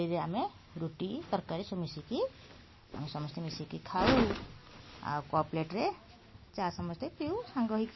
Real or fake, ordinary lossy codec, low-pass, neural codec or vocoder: real; MP3, 24 kbps; 7.2 kHz; none